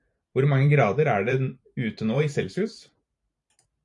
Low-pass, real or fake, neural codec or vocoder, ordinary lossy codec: 10.8 kHz; fake; vocoder, 44.1 kHz, 128 mel bands every 512 samples, BigVGAN v2; AAC, 64 kbps